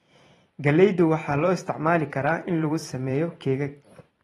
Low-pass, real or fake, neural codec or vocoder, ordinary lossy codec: 19.8 kHz; fake; vocoder, 44.1 kHz, 128 mel bands every 512 samples, BigVGAN v2; AAC, 32 kbps